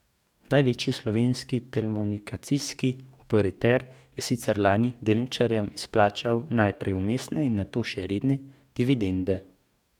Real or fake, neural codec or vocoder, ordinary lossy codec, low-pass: fake; codec, 44.1 kHz, 2.6 kbps, DAC; none; 19.8 kHz